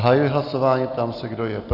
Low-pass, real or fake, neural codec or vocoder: 5.4 kHz; real; none